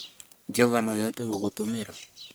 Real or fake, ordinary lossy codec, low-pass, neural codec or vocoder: fake; none; none; codec, 44.1 kHz, 1.7 kbps, Pupu-Codec